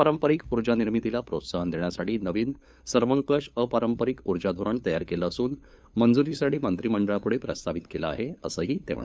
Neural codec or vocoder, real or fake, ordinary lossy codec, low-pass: codec, 16 kHz, 8 kbps, FunCodec, trained on LibriTTS, 25 frames a second; fake; none; none